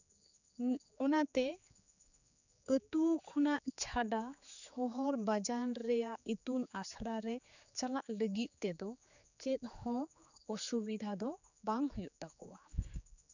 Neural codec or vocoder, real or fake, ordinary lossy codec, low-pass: codec, 16 kHz, 4 kbps, X-Codec, HuBERT features, trained on general audio; fake; none; 7.2 kHz